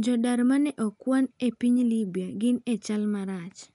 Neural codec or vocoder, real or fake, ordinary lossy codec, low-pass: none; real; none; 10.8 kHz